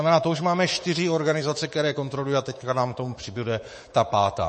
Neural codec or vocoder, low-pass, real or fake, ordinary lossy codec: codec, 24 kHz, 3.1 kbps, DualCodec; 10.8 kHz; fake; MP3, 32 kbps